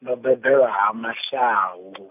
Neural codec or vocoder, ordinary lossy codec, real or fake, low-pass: none; none; real; 3.6 kHz